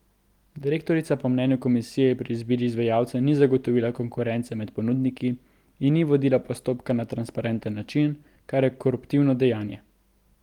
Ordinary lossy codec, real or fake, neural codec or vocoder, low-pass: Opus, 24 kbps; real; none; 19.8 kHz